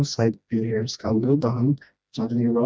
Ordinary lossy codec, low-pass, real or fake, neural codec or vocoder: none; none; fake; codec, 16 kHz, 1 kbps, FreqCodec, smaller model